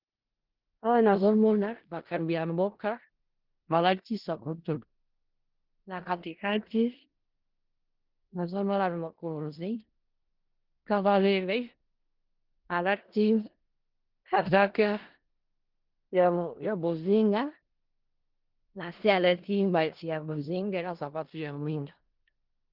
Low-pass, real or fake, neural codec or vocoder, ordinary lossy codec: 5.4 kHz; fake; codec, 16 kHz in and 24 kHz out, 0.4 kbps, LongCat-Audio-Codec, four codebook decoder; Opus, 16 kbps